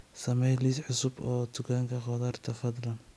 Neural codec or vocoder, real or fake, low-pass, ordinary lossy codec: none; real; none; none